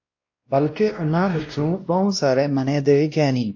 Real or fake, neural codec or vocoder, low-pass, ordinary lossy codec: fake; codec, 16 kHz, 1 kbps, X-Codec, WavLM features, trained on Multilingual LibriSpeech; 7.2 kHz; AAC, 48 kbps